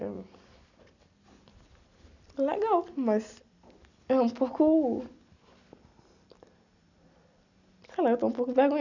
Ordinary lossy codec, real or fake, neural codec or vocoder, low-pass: none; real; none; 7.2 kHz